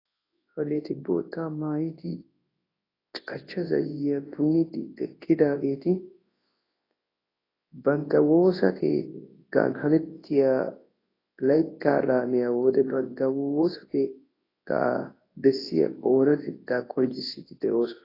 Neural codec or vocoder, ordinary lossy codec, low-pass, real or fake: codec, 24 kHz, 0.9 kbps, WavTokenizer, large speech release; AAC, 24 kbps; 5.4 kHz; fake